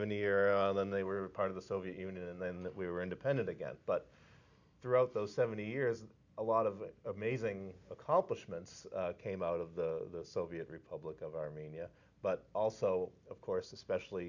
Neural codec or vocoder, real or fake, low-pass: none; real; 7.2 kHz